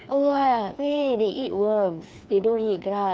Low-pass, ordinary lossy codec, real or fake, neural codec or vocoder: none; none; fake; codec, 16 kHz, 2 kbps, FreqCodec, larger model